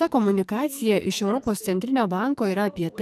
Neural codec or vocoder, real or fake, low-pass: codec, 44.1 kHz, 2.6 kbps, SNAC; fake; 14.4 kHz